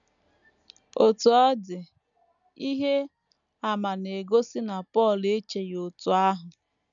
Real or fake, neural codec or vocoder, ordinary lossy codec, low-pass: real; none; none; 7.2 kHz